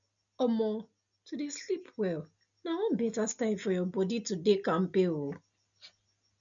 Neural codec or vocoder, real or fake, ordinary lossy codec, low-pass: none; real; none; 7.2 kHz